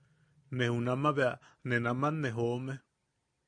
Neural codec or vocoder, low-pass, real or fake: none; 9.9 kHz; real